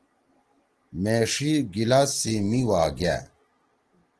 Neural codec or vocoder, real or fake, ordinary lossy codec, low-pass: none; real; Opus, 16 kbps; 10.8 kHz